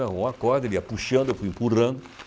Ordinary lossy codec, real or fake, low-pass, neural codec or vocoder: none; real; none; none